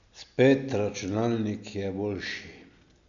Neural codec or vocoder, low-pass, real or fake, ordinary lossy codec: none; 7.2 kHz; real; none